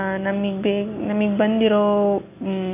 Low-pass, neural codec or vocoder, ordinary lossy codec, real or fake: 3.6 kHz; none; AAC, 24 kbps; real